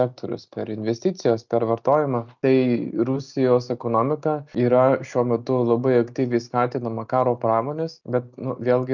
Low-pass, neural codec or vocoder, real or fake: 7.2 kHz; none; real